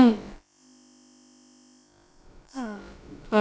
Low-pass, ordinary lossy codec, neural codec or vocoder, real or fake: none; none; codec, 16 kHz, about 1 kbps, DyCAST, with the encoder's durations; fake